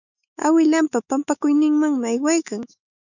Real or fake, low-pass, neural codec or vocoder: fake; 7.2 kHz; autoencoder, 48 kHz, 128 numbers a frame, DAC-VAE, trained on Japanese speech